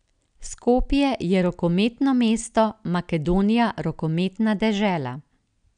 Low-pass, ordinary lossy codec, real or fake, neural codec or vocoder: 9.9 kHz; none; real; none